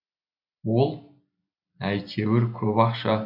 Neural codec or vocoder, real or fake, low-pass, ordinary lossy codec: none; real; 5.4 kHz; none